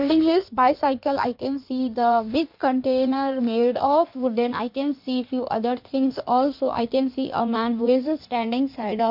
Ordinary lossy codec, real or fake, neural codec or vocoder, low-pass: MP3, 48 kbps; fake; codec, 16 kHz in and 24 kHz out, 1.1 kbps, FireRedTTS-2 codec; 5.4 kHz